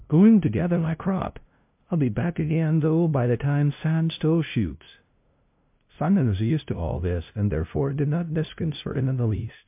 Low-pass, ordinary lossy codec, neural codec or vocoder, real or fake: 3.6 kHz; MP3, 32 kbps; codec, 16 kHz, 0.5 kbps, FunCodec, trained on LibriTTS, 25 frames a second; fake